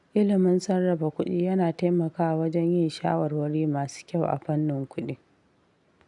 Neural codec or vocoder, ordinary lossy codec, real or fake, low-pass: none; none; real; 10.8 kHz